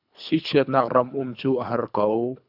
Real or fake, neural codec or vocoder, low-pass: fake; codec, 24 kHz, 3 kbps, HILCodec; 5.4 kHz